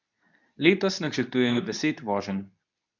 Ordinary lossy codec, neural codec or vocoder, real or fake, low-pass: none; codec, 24 kHz, 0.9 kbps, WavTokenizer, medium speech release version 2; fake; 7.2 kHz